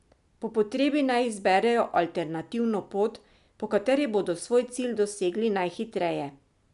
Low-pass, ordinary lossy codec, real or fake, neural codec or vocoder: 10.8 kHz; none; real; none